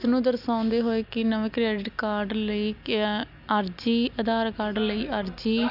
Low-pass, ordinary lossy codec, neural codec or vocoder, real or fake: 5.4 kHz; AAC, 48 kbps; none; real